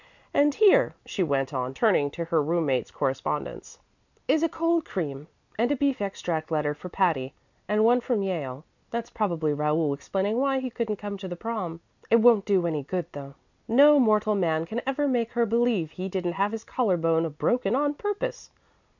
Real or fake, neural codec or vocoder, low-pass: real; none; 7.2 kHz